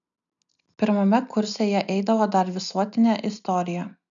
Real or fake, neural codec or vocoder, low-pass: real; none; 7.2 kHz